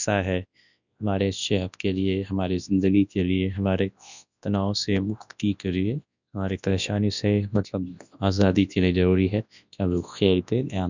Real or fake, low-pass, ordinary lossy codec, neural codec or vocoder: fake; 7.2 kHz; none; codec, 24 kHz, 0.9 kbps, WavTokenizer, large speech release